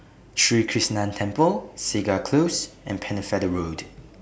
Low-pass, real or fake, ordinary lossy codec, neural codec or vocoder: none; real; none; none